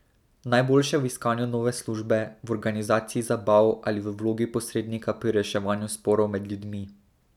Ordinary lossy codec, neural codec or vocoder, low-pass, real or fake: none; none; 19.8 kHz; real